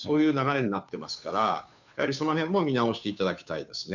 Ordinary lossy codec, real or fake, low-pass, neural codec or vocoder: none; fake; 7.2 kHz; codec, 16 kHz, 2 kbps, FunCodec, trained on Chinese and English, 25 frames a second